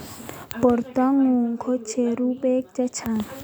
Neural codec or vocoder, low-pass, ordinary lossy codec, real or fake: none; none; none; real